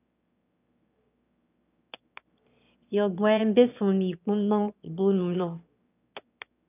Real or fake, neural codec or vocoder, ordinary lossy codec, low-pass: fake; autoencoder, 22.05 kHz, a latent of 192 numbers a frame, VITS, trained on one speaker; none; 3.6 kHz